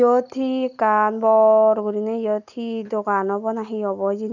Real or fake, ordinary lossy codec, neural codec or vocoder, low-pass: real; none; none; 7.2 kHz